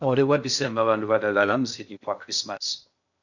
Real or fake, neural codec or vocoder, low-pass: fake; codec, 16 kHz in and 24 kHz out, 0.6 kbps, FocalCodec, streaming, 2048 codes; 7.2 kHz